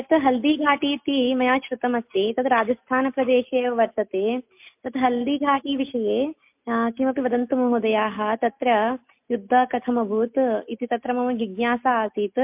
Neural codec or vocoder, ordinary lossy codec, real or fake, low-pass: none; MP3, 32 kbps; real; 3.6 kHz